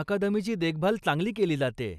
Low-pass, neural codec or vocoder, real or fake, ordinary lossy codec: 14.4 kHz; none; real; none